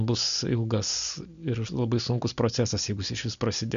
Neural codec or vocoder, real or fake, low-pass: none; real; 7.2 kHz